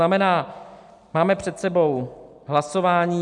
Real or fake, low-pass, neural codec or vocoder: real; 10.8 kHz; none